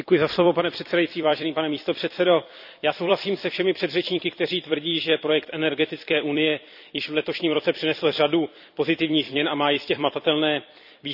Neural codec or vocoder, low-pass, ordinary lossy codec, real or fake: vocoder, 44.1 kHz, 128 mel bands every 256 samples, BigVGAN v2; 5.4 kHz; none; fake